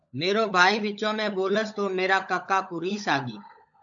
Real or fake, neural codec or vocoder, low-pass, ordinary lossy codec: fake; codec, 16 kHz, 16 kbps, FunCodec, trained on LibriTTS, 50 frames a second; 7.2 kHz; AAC, 64 kbps